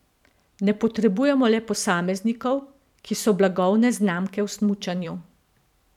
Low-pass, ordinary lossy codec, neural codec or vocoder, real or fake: 19.8 kHz; none; vocoder, 44.1 kHz, 128 mel bands every 512 samples, BigVGAN v2; fake